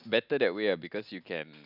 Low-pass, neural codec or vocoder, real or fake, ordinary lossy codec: 5.4 kHz; none; real; none